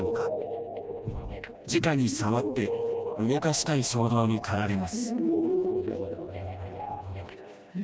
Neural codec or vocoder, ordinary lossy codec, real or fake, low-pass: codec, 16 kHz, 1 kbps, FreqCodec, smaller model; none; fake; none